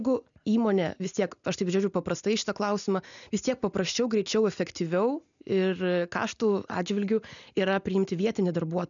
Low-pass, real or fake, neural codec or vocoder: 7.2 kHz; real; none